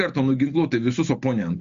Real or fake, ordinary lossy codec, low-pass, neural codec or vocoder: real; MP3, 64 kbps; 7.2 kHz; none